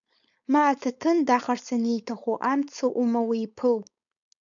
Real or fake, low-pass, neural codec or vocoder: fake; 7.2 kHz; codec, 16 kHz, 4.8 kbps, FACodec